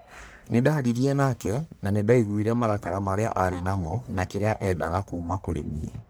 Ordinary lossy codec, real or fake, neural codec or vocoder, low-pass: none; fake; codec, 44.1 kHz, 1.7 kbps, Pupu-Codec; none